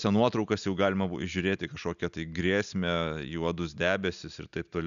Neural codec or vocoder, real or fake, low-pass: none; real; 7.2 kHz